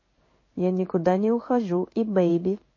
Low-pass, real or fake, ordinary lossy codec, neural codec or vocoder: 7.2 kHz; fake; MP3, 32 kbps; codec, 16 kHz in and 24 kHz out, 1 kbps, XY-Tokenizer